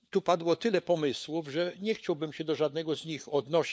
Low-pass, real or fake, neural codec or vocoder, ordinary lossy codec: none; fake; codec, 16 kHz, 4 kbps, FunCodec, trained on LibriTTS, 50 frames a second; none